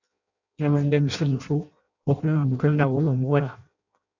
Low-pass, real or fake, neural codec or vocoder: 7.2 kHz; fake; codec, 16 kHz in and 24 kHz out, 0.6 kbps, FireRedTTS-2 codec